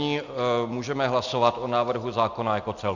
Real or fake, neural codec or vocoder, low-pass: real; none; 7.2 kHz